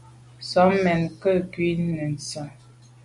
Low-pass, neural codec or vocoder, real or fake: 10.8 kHz; none; real